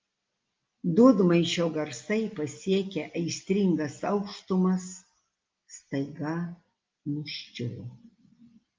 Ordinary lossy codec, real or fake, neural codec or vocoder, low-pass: Opus, 32 kbps; real; none; 7.2 kHz